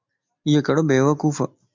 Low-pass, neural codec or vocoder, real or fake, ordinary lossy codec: 7.2 kHz; none; real; MP3, 64 kbps